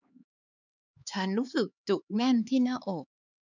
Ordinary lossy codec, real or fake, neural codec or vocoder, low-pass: none; fake; codec, 16 kHz, 4 kbps, X-Codec, HuBERT features, trained on LibriSpeech; 7.2 kHz